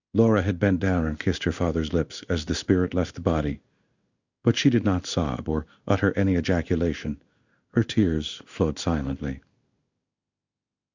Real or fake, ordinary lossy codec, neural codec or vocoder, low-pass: fake; Opus, 64 kbps; codec, 16 kHz in and 24 kHz out, 1 kbps, XY-Tokenizer; 7.2 kHz